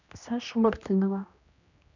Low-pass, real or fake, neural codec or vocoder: 7.2 kHz; fake; codec, 16 kHz, 1 kbps, X-Codec, HuBERT features, trained on general audio